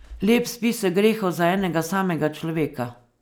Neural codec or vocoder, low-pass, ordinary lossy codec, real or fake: none; none; none; real